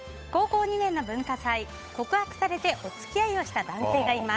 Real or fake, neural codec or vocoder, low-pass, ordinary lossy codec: fake; codec, 16 kHz, 8 kbps, FunCodec, trained on Chinese and English, 25 frames a second; none; none